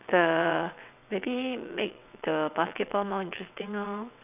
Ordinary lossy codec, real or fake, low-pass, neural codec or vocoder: none; fake; 3.6 kHz; vocoder, 22.05 kHz, 80 mel bands, WaveNeXt